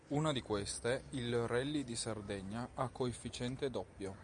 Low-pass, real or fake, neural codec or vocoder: 9.9 kHz; real; none